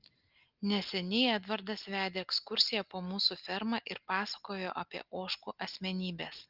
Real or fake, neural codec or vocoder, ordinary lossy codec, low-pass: real; none; Opus, 32 kbps; 5.4 kHz